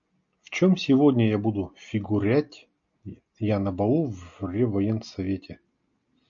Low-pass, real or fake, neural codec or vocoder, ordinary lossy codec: 7.2 kHz; real; none; MP3, 48 kbps